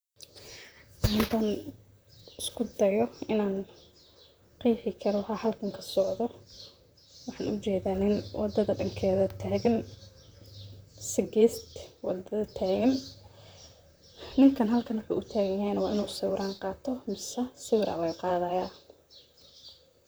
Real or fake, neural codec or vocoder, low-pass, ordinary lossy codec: fake; vocoder, 44.1 kHz, 128 mel bands, Pupu-Vocoder; none; none